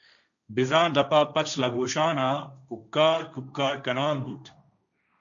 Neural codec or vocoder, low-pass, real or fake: codec, 16 kHz, 1.1 kbps, Voila-Tokenizer; 7.2 kHz; fake